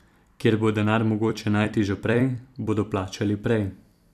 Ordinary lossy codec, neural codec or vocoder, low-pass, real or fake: none; vocoder, 44.1 kHz, 128 mel bands every 256 samples, BigVGAN v2; 14.4 kHz; fake